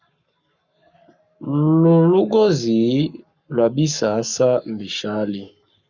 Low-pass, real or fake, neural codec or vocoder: 7.2 kHz; fake; codec, 44.1 kHz, 7.8 kbps, Pupu-Codec